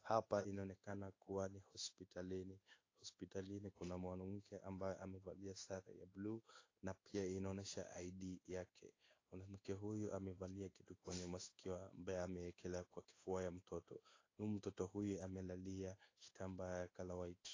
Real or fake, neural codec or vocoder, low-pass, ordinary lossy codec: fake; codec, 16 kHz in and 24 kHz out, 1 kbps, XY-Tokenizer; 7.2 kHz; AAC, 32 kbps